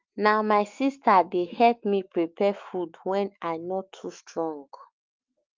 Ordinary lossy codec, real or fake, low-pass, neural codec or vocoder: Opus, 24 kbps; fake; 7.2 kHz; autoencoder, 48 kHz, 128 numbers a frame, DAC-VAE, trained on Japanese speech